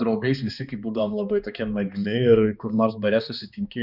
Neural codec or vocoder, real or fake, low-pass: codec, 16 kHz, 2 kbps, X-Codec, HuBERT features, trained on balanced general audio; fake; 5.4 kHz